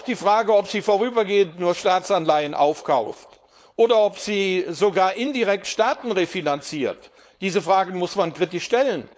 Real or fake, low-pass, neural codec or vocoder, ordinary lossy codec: fake; none; codec, 16 kHz, 4.8 kbps, FACodec; none